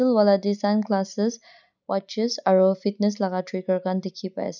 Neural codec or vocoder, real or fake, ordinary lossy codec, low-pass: none; real; none; 7.2 kHz